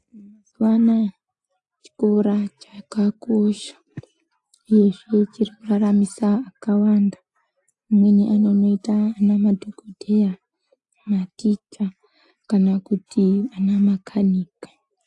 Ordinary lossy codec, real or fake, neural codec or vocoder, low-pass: AAC, 64 kbps; real; none; 10.8 kHz